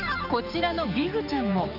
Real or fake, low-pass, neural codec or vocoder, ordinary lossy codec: fake; 5.4 kHz; codec, 16 kHz, 4 kbps, X-Codec, HuBERT features, trained on general audio; none